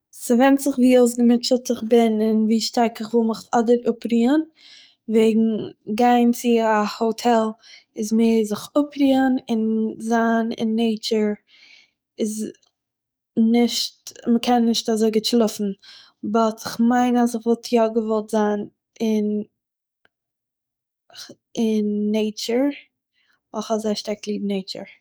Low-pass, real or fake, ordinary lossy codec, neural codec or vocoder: none; fake; none; codec, 44.1 kHz, 7.8 kbps, DAC